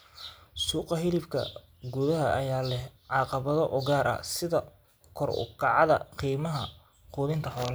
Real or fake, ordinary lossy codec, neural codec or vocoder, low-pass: real; none; none; none